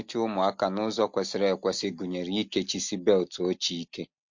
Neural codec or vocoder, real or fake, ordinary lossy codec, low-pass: none; real; MP3, 48 kbps; 7.2 kHz